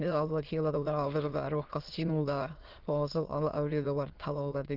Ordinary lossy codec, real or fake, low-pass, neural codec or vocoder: Opus, 16 kbps; fake; 5.4 kHz; autoencoder, 22.05 kHz, a latent of 192 numbers a frame, VITS, trained on many speakers